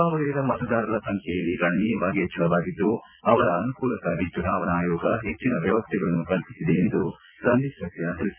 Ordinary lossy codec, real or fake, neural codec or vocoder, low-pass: none; fake; vocoder, 22.05 kHz, 80 mel bands, Vocos; 3.6 kHz